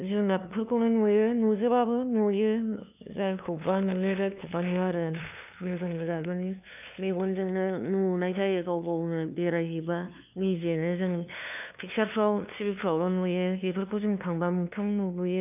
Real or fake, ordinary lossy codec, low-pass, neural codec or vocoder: fake; none; 3.6 kHz; codec, 16 kHz, 2 kbps, FunCodec, trained on LibriTTS, 25 frames a second